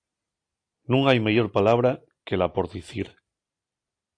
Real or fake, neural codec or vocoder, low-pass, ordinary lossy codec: real; none; 9.9 kHz; AAC, 48 kbps